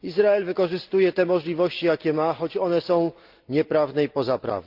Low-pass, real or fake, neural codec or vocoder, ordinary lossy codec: 5.4 kHz; real; none; Opus, 32 kbps